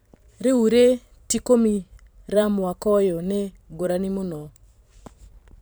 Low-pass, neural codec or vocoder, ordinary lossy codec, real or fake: none; vocoder, 44.1 kHz, 128 mel bands every 512 samples, BigVGAN v2; none; fake